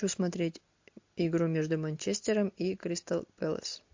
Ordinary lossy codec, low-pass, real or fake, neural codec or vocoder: MP3, 48 kbps; 7.2 kHz; real; none